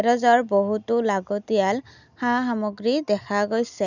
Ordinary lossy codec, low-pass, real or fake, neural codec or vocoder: none; 7.2 kHz; real; none